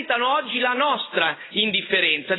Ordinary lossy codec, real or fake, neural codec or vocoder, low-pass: AAC, 16 kbps; real; none; 7.2 kHz